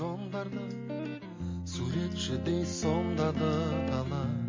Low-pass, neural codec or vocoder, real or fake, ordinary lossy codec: 7.2 kHz; none; real; MP3, 32 kbps